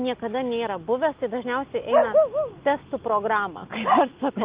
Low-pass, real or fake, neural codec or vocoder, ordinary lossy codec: 3.6 kHz; real; none; Opus, 32 kbps